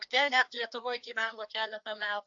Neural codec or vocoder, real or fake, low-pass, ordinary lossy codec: codec, 16 kHz, 2 kbps, FreqCodec, larger model; fake; 7.2 kHz; AAC, 48 kbps